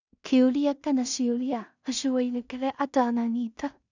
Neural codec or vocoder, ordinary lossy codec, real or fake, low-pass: codec, 16 kHz in and 24 kHz out, 0.4 kbps, LongCat-Audio-Codec, two codebook decoder; none; fake; 7.2 kHz